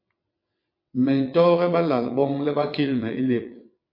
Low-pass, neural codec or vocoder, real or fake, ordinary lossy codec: 5.4 kHz; vocoder, 22.05 kHz, 80 mel bands, WaveNeXt; fake; MP3, 32 kbps